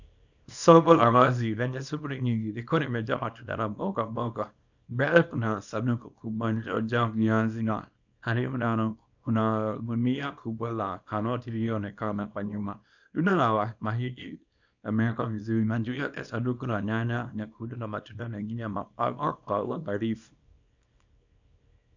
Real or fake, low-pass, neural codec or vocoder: fake; 7.2 kHz; codec, 24 kHz, 0.9 kbps, WavTokenizer, small release